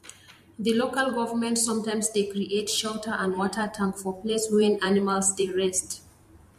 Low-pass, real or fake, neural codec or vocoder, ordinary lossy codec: 14.4 kHz; fake; vocoder, 44.1 kHz, 128 mel bands every 512 samples, BigVGAN v2; MP3, 64 kbps